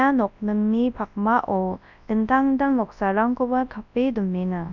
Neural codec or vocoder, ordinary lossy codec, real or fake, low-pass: codec, 24 kHz, 0.9 kbps, WavTokenizer, large speech release; none; fake; 7.2 kHz